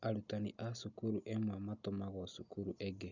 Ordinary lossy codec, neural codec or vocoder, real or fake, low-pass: none; none; real; 7.2 kHz